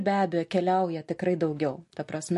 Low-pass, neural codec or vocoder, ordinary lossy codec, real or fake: 14.4 kHz; none; MP3, 48 kbps; real